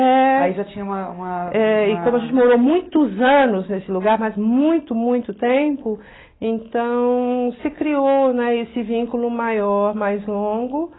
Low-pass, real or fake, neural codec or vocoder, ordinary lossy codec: 7.2 kHz; real; none; AAC, 16 kbps